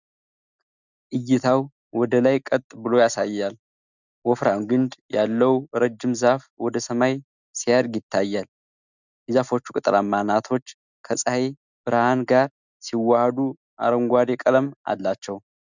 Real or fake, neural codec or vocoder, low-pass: real; none; 7.2 kHz